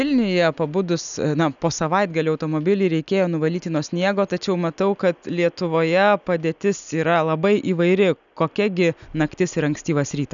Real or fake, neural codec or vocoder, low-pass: real; none; 7.2 kHz